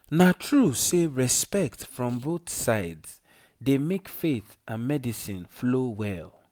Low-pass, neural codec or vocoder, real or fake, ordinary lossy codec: none; none; real; none